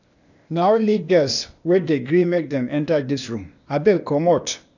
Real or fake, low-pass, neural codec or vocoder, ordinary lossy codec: fake; 7.2 kHz; codec, 16 kHz, 0.8 kbps, ZipCodec; none